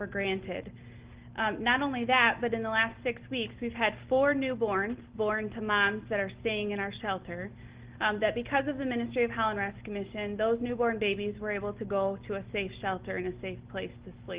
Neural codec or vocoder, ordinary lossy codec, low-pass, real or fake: none; Opus, 16 kbps; 3.6 kHz; real